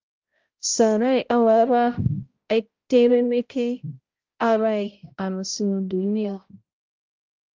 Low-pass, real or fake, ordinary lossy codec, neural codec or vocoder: 7.2 kHz; fake; Opus, 32 kbps; codec, 16 kHz, 0.5 kbps, X-Codec, HuBERT features, trained on balanced general audio